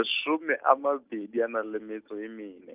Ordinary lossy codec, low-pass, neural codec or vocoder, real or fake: Opus, 32 kbps; 3.6 kHz; none; real